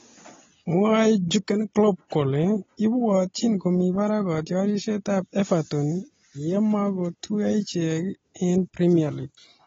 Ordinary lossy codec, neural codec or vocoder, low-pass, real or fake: AAC, 24 kbps; none; 7.2 kHz; real